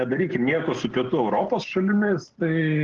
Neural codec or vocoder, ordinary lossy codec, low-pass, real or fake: none; Opus, 16 kbps; 7.2 kHz; real